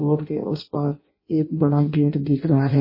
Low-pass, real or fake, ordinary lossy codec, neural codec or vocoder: 5.4 kHz; fake; MP3, 24 kbps; codec, 16 kHz in and 24 kHz out, 0.6 kbps, FireRedTTS-2 codec